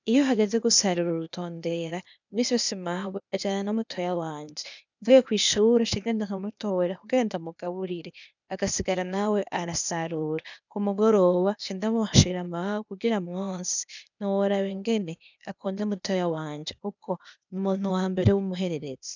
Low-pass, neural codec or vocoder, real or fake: 7.2 kHz; codec, 16 kHz, 0.8 kbps, ZipCodec; fake